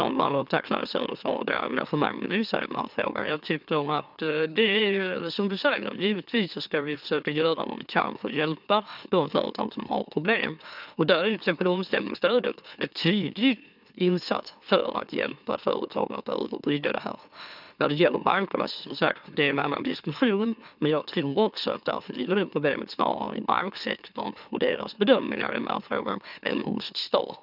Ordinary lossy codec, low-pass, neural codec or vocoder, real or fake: none; 5.4 kHz; autoencoder, 44.1 kHz, a latent of 192 numbers a frame, MeloTTS; fake